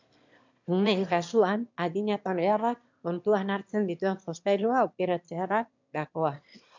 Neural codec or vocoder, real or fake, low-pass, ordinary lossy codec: autoencoder, 22.05 kHz, a latent of 192 numbers a frame, VITS, trained on one speaker; fake; 7.2 kHz; MP3, 64 kbps